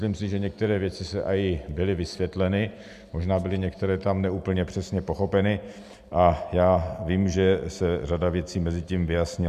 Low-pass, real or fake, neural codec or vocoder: 14.4 kHz; real; none